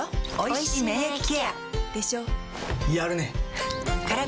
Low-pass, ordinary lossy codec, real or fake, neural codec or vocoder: none; none; real; none